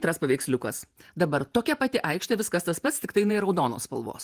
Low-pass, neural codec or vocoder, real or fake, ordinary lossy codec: 14.4 kHz; vocoder, 48 kHz, 128 mel bands, Vocos; fake; Opus, 24 kbps